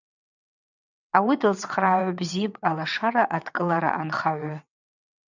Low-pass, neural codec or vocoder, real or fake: 7.2 kHz; vocoder, 22.05 kHz, 80 mel bands, WaveNeXt; fake